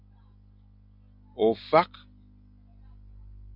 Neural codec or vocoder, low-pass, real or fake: none; 5.4 kHz; real